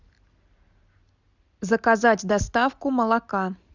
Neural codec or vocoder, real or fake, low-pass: none; real; 7.2 kHz